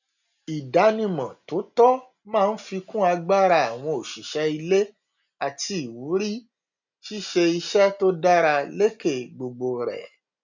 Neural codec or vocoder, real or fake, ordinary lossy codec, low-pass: none; real; none; 7.2 kHz